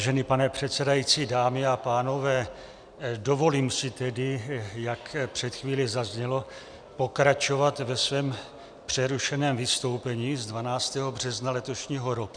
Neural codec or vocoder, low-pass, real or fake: none; 9.9 kHz; real